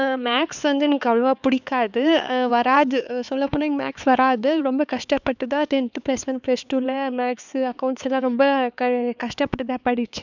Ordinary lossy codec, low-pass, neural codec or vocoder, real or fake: none; 7.2 kHz; codec, 16 kHz, 4 kbps, X-Codec, HuBERT features, trained on LibriSpeech; fake